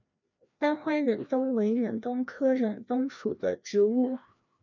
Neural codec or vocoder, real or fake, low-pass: codec, 16 kHz, 1 kbps, FreqCodec, larger model; fake; 7.2 kHz